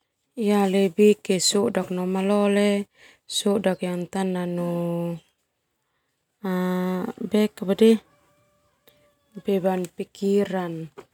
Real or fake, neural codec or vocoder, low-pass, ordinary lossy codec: real; none; 19.8 kHz; none